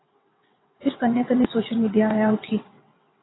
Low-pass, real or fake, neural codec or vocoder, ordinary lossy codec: 7.2 kHz; fake; vocoder, 22.05 kHz, 80 mel bands, WaveNeXt; AAC, 16 kbps